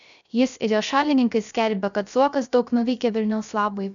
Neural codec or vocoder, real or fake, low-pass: codec, 16 kHz, 0.3 kbps, FocalCodec; fake; 7.2 kHz